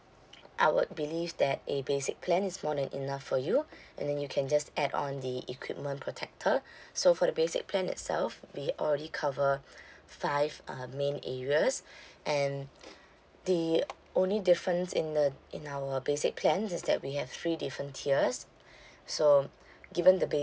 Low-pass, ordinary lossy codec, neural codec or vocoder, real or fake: none; none; none; real